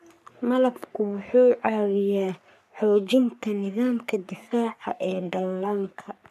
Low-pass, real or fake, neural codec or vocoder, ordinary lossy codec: 14.4 kHz; fake; codec, 44.1 kHz, 3.4 kbps, Pupu-Codec; none